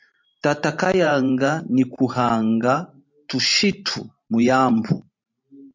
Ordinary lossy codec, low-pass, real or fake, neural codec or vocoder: MP3, 48 kbps; 7.2 kHz; fake; vocoder, 44.1 kHz, 128 mel bands every 512 samples, BigVGAN v2